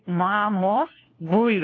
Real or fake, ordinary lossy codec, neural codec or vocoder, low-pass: fake; AAC, 32 kbps; codec, 16 kHz, 1 kbps, FunCodec, trained on LibriTTS, 50 frames a second; 7.2 kHz